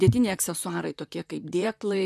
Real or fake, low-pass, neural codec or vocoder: fake; 14.4 kHz; vocoder, 44.1 kHz, 128 mel bands, Pupu-Vocoder